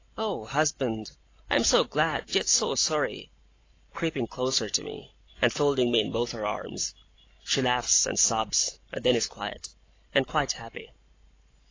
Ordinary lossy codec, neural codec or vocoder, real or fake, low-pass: AAC, 32 kbps; none; real; 7.2 kHz